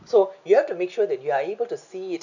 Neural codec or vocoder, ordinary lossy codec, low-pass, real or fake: none; none; 7.2 kHz; real